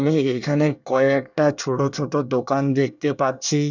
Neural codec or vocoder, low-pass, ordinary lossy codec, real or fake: codec, 24 kHz, 1 kbps, SNAC; 7.2 kHz; none; fake